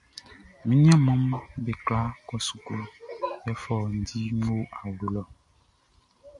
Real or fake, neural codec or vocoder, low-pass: real; none; 10.8 kHz